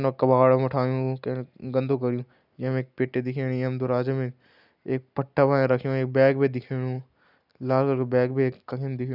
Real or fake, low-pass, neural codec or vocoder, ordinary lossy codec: real; 5.4 kHz; none; none